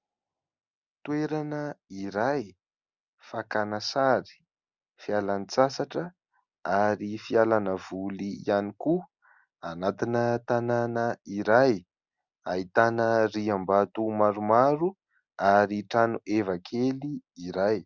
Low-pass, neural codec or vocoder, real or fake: 7.2 kHz; none; real